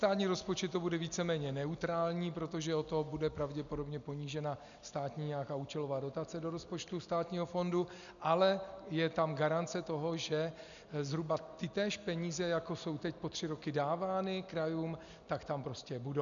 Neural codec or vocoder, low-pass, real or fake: none; 7.2 kHz; real